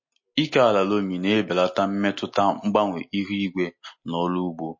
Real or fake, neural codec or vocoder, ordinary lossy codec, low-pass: real; none; MP3, 32 kbps; 7.2 kHz